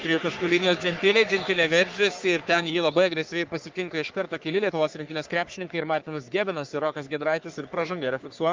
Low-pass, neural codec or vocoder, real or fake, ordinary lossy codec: 7.2 kHz; codec, 44.1 kHz, 3.4 kbps, Pupu-Codec; fake; Opus, 32 kbps